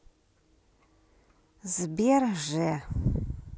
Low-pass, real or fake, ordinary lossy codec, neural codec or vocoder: none; real; none; none